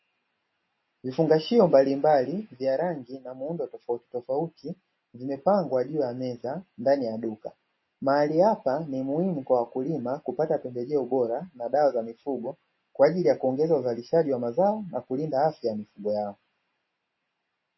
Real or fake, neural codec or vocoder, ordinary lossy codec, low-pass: real; none; MP3, 24 kbps; 7.2 kHz